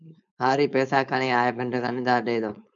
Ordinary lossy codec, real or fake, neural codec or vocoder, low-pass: MP3, 96 kbps; fake; codec, 16 kHz, 4.8 kbps, FACodec; 7.2 kHz